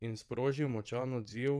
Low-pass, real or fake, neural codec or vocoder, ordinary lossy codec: none; fake; vocoder, 22.05 kHz, 80 mel bands, WaveNeXt; none